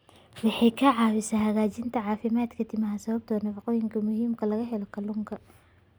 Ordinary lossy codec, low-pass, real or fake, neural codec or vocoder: none; none; real; none